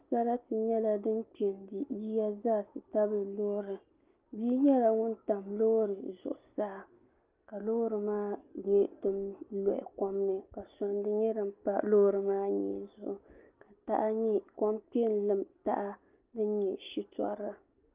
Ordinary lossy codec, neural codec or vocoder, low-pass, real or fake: Opus, 24 kbps; none; 3.6 kHz; real